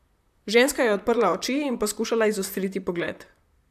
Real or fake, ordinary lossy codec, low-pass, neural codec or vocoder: fake; AAC, 96 kbps; 14.4 kHz; vocoder, 44.1 kHz, 128 mel bands, Pupu-Vocoder